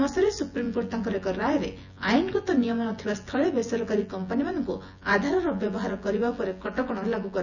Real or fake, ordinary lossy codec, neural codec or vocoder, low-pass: fake; none; vocoder, 24 kHz, 100 mel bands, Vocos; 7.2 kHz